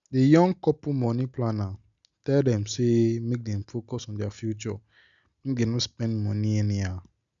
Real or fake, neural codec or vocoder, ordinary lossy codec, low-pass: real; none; none; 7.2 kHz